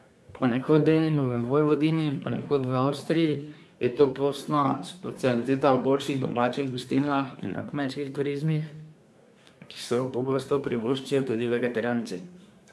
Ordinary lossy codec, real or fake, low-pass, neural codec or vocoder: none; fake; none; codec, 24 kHz, 1 kbps, SNAC